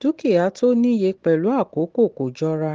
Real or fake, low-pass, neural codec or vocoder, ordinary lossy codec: real; 7.2 kHz; none; Opus, 16 kbps